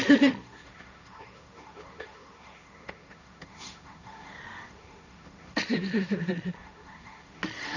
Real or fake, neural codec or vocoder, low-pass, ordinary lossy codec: fake; codec, 16 kHz, 1.1 kbps, Voila-Tokenizer; 7.2 kHz; none